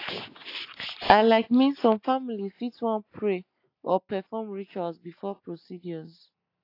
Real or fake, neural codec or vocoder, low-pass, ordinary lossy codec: real; none; 5.4 kHz; AAC, 32 kbps